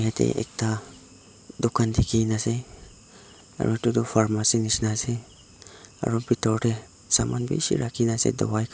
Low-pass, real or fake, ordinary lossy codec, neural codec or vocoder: none; real; none; none